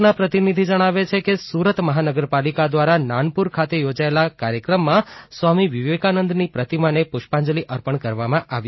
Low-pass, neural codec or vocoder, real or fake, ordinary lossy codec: 7.2 kHz; none; real; MP3, 24 kbps